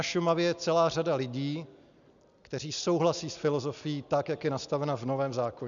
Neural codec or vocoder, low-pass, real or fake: none; 7.2 kHz; real